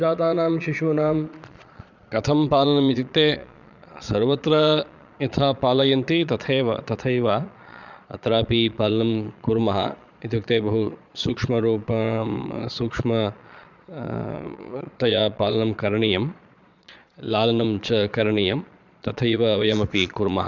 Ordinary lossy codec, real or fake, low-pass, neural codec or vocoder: none; fake; 7.2 kHz; vocoder, 22.05 kHz, 80 mel bands, Vocos